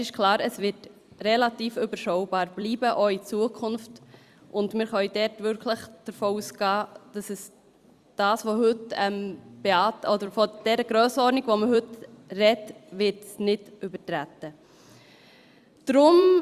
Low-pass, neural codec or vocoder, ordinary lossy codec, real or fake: 14.4 kHz; vocoder, 44.1 kHz, 128 mel bands every 256 samples, BigVGAN v2; Opus, 64 kbps; fake